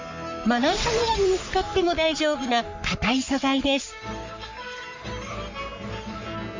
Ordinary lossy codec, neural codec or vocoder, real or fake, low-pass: MP3, 64 kbps; codec, 44.1 kHz, 3.4 kbps, Pupu-Codec; fake; 7.2 kHz